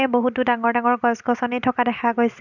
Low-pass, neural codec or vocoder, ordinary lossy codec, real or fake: 7.2 kHz; none; none; real